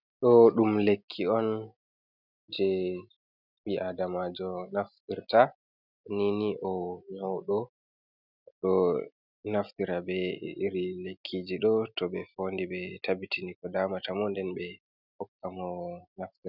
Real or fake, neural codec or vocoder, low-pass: real; none; 5.4 kHz